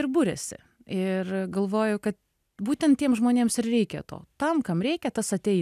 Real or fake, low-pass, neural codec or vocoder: real; 14.4 kHz; none